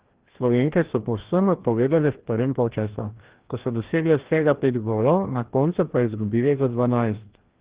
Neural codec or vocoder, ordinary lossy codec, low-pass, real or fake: codec, 16 kHz, 1 kbps, FreqCodec, larger model; Opus, 16 kbps; 3.6 kHz; fake